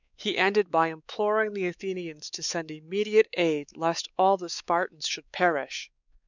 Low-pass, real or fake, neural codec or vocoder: 7.2 kHz; fake; codec, 16 kHz, 4 kbps, X-Codec, WavLM features, trained on Multilingual LibriSpeech